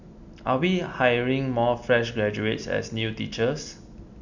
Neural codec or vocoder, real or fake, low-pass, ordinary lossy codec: none; real; 7.2 kHz; none